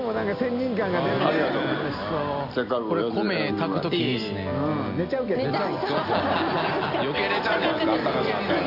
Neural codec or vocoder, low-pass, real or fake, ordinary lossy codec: none; 5.4 kHz; real; Opus, 64 kbps